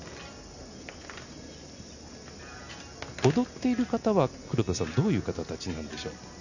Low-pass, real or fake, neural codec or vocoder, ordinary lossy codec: 7.2 kHz; real; none; none